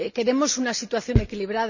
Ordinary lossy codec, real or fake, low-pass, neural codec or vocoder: none; real; 7.2 kHz; none